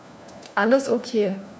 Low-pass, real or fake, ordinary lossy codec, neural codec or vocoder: none; fake; none; codec, 16 kHz, 1 kbps, FunCodec, trained on LibriTTS, 50 frames a second